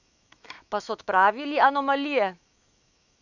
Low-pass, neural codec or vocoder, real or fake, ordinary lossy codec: 7.2 kHz; autoencoder, 48 kHz, 128 numbers a frame, DAC-VAE, trained on Japanese speech; fake; none